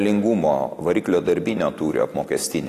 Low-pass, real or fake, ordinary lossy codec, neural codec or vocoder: 14.4 kHz; real; AAC, 48 kbps; none